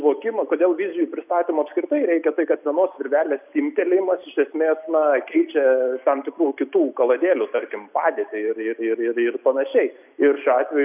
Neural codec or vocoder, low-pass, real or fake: none; 3.6 kHz; real